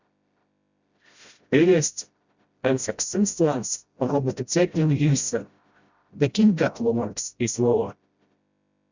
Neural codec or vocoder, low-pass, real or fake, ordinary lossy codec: codec, 16 kHz, 0.5 kbps, FreqCodec, smaller model; 7.2 kHz; fake; Opus, 64 kbps